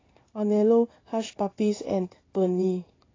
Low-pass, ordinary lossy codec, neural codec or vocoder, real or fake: 7.2 kHz; AAC, 32 kbps; codec, 16 kHz in and 24 kHz out, 1 kbps, XY-Tokenizer; fake